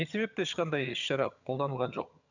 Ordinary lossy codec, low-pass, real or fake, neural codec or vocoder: none; 7.2 kHz; fake; vocoder, 22.05 kHz, 80 mel bands, HiFi-GAN